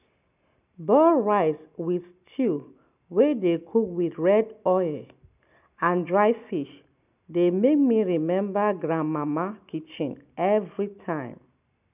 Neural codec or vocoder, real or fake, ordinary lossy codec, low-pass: none; real; none; 3.6 kHz